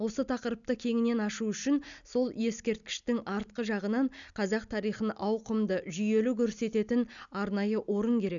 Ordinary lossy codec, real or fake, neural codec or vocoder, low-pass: none; real; none; 7.2 kHz